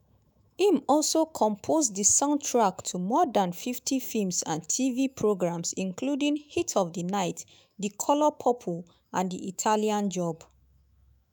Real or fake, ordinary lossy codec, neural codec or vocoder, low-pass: fake; none; autoencoder, 48 kHz, 128 numbers a frame, DAC-VAE, trained on Japanese speech; none